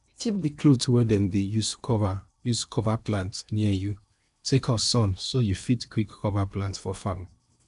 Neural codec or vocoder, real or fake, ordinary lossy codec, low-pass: codec, 16 kHz in and 24 kHz out, 0.8 kbps, FocalCodec, streaming, 65536 codes; fake; none; 10.8 kHz